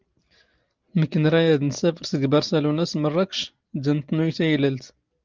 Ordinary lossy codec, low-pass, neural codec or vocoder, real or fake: Opus, 32 kbps; 7.2 kHz; none; real